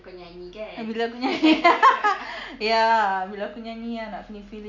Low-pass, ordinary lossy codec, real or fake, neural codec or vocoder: 7.2 kHz; none; real; none